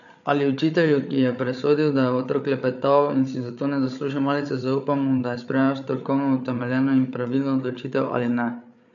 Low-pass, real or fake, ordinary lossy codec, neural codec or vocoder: 7.2 kHz; fake; none; codec, 16 kHz, 8 kbps, FreqCodec, larger model